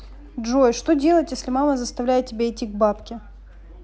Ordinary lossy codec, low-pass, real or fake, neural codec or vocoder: none; none; real; none